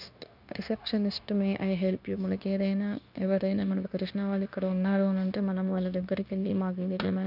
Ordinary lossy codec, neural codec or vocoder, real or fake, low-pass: none; codec, 16 kHz, 0.9 kbps, LongCat-Audio-Codec; fake; 5.4 kHz